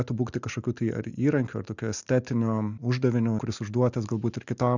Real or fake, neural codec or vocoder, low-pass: real; none; 7.2 kHz